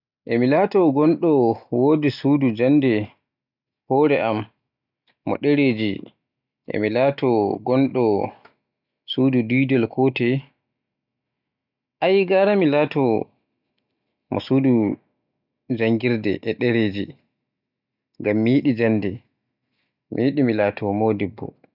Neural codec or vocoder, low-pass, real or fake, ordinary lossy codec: none; 5.4 kHz; real; none